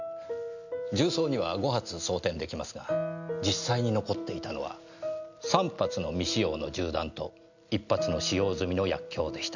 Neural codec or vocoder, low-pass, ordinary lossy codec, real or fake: none; 7.2 kHz; none; real